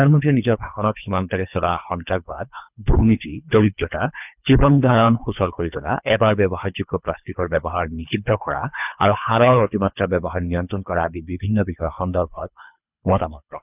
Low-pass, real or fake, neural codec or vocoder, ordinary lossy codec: 3.6 kHz; fake; codec, 24 kHz, 3 kbps, HILCodec; none